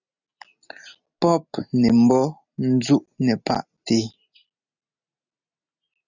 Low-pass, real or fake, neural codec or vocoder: 7.2 kHz; real; none